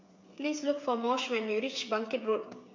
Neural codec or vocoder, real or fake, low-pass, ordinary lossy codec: codec, 16 kHz, 8 kbps, FreqCodec, smaller model; fake; 7.2 kHz; MP3, 48 kbps